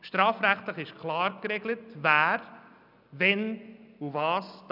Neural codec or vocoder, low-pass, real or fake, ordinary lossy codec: none; 5.4 kHz; real; none